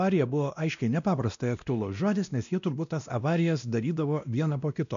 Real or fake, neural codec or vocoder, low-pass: fake; codec, 16 kHz, 1 kbps, X-Codec, WavLM features, trained on Multilingual LibriSpeech; 7.2 kHz